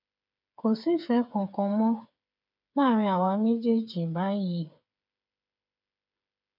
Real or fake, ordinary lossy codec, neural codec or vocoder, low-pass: fake; none; codec, 16 kHz, 8 kbps, FreqCodec, smaller model; 5.4 kHz